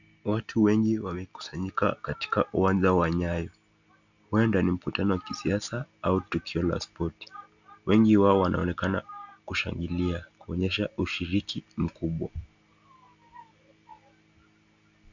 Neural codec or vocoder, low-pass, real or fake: none; 7.2 kHz; real